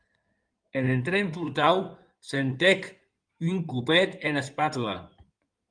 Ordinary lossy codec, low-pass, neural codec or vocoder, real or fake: Opus, 32 kbps; 9.9 kHz; codec, 16 kHz in and 24 kHz out, 2.2 kbps, FireRedTTS-2 codec; fake